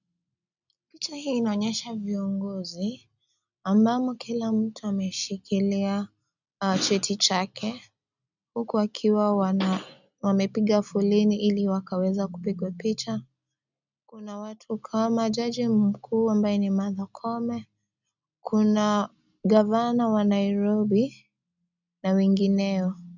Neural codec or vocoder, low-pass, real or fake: none; 7.2 kHz; real